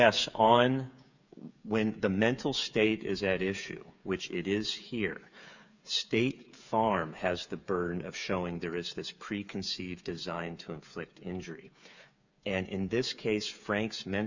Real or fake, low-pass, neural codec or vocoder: fake; 7.2 kHz; codec, 16 kHz, 8 kbps, FreqCodec, smaller model